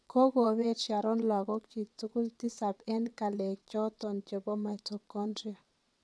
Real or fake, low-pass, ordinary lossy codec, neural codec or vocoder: fake; none; none; vocoder, 22.05 kHz, 80 mel bands, WaveNeXt